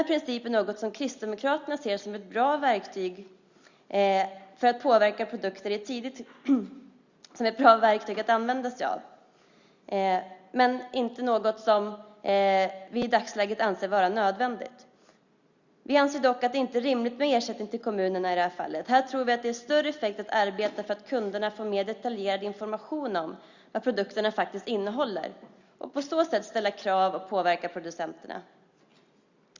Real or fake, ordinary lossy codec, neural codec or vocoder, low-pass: real; Opus, 64 kbps; none; 7.2 kHz